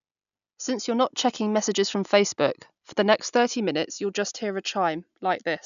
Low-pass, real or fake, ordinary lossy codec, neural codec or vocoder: 7.2 kHz; real; none; none